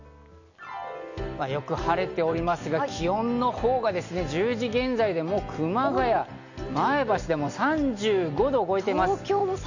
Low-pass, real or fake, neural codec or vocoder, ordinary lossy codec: 7.2 kHz; real; none; none